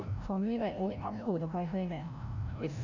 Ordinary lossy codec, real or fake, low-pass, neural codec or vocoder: none; fake; 7.2 kHz; codec, 16 kHz, 0.5 kbps, FreqCodec, larger model